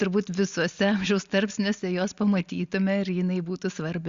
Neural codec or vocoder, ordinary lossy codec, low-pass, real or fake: none; Opus, 64 kbps; 7.2 kHz; real